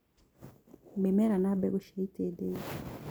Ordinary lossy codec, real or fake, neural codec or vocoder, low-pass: none; real; none; none